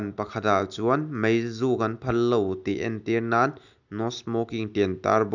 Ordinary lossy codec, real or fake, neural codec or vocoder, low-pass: none; real; none; 7.2 kHz